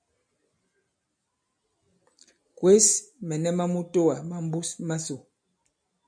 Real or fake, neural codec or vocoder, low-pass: real; none; 9.9 kHz